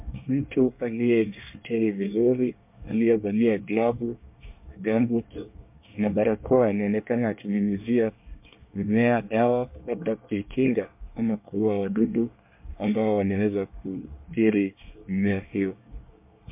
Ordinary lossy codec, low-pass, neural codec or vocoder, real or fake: MP3, 32 kbps; 3.6 kHz; codec, 24 kHz, 1 kbps, SNAC; fake